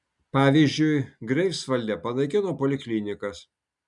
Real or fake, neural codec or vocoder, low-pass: real; none; 10.8 kHz